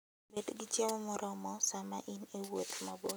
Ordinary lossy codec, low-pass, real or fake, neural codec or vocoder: none; none; real; none